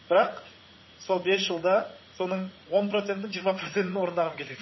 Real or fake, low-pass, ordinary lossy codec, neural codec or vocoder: fake; 7.2 kHz; MP3, 24 kbps; vocoder, 44.1 kHz, 80 mel bands, Vocos